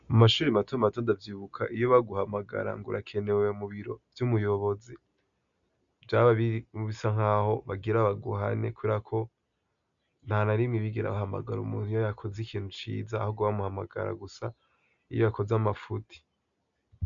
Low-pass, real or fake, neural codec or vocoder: 7.2 kHz; real; none